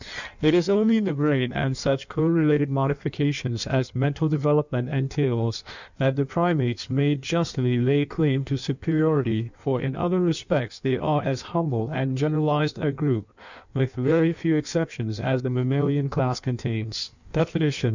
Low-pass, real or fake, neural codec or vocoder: 7.2 kHz; fake; codec, 16 kHz in and 24 kHz out, 1.1 kbps, FireRedTTS-2 codec